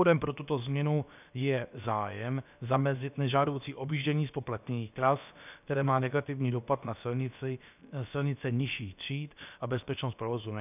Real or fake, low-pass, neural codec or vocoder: fake; 3.6 kHz; codec, 16 kHz, about 1 kbps, DyCAST, with the encoder's durations